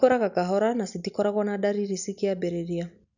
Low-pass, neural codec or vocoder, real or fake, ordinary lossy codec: 7.2 kHz; none; real; none